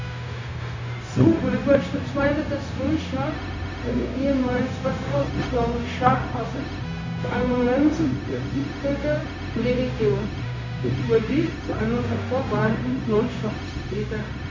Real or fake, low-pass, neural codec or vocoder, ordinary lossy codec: fake; 7.2 kHz; codec, 16 kHz, 0.4 kbps, LongCat-Audio-Codec; MP3, 64 kbps